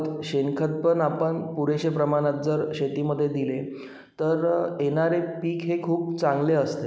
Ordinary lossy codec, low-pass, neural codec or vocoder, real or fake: none; none; none; real